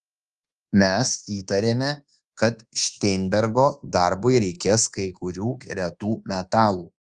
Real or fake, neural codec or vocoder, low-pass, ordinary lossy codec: fake; codec, 24 kHz, 1.2 kbps, DualCodec; 10.8 kHz; Opus, 24 kbps